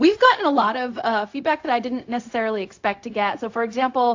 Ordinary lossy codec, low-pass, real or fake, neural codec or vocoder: AAC, 48 kbps; 7.2 kHz; fake; codec, 16 kHz, 0.4 kbps, LongCat-Audio-Codec